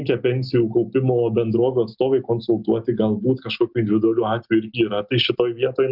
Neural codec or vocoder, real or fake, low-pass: none; real; 5.4 kHz